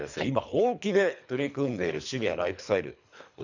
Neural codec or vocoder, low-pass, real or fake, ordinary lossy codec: codec, 24 kHz, 3 kbps, HILCodec; 7.2 kHz; fake; none